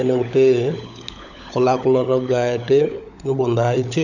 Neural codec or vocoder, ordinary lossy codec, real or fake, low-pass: codec, 16 kHz, 16 kbps, FunCodec, trained on LibriTTS, 50 frames a second; none; fake; 7.2 kHz